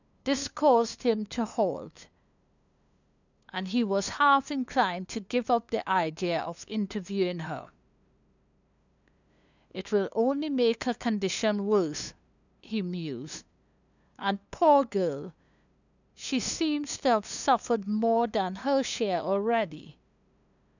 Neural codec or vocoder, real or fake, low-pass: codec, 16 kHz, 2 kbps, FunCodec, trained on LibriTTS, 25 frames a second; fake; 7.2 kHz